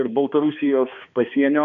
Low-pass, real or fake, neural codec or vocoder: 7.2 kHz; fake; codec, 16 kHz, 2 kbps, X-Codec, HuBERT features, trained on balanced general audio